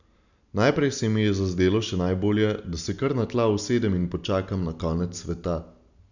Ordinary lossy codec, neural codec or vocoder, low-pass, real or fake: none; none; 7.2 kHz; real